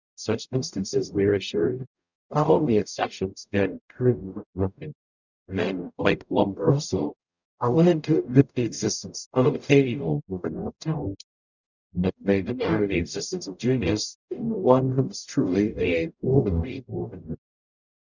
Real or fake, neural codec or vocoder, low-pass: fake; codec, 44.1 kHz, 0.9 kbps, DAC; 7.2 kHz